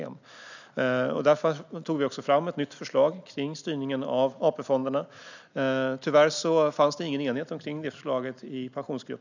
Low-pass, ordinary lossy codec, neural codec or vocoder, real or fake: 7.2 kHz; none; none; real